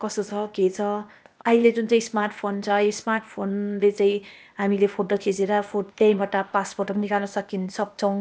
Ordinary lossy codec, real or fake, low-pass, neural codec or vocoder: none; fake; none; codec, 16 kHz, 0.8 kbps, ZipCodec